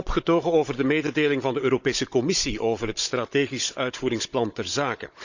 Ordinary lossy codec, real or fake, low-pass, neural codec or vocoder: none; fake; 7.2 kHz; codec, 16 kHz, 16 kbps, FunCodec, trained on Chinese and English, 50 frames a second